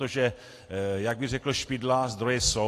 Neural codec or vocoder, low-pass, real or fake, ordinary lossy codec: none; 14.4 kHz; real; AAC, 48 kbps